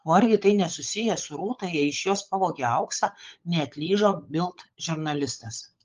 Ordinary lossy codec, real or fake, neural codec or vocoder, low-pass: Opus, 32 kbps; fake; codec, 16 kHz, 16 kbps, FunCodec, trained on LibriTTS, 50 frames a second; 7.2 kHz